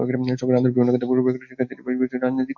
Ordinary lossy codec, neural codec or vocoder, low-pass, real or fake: AAC, 48 kbps; none; 7.2 kHz; real